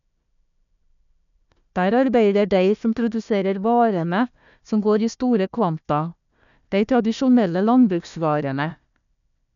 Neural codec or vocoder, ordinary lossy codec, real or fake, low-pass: codec, 16 kHz, 1 kbps, FunCodec, trained on Chinese and English, 50 frames a second; none; fake; 7.2 kHz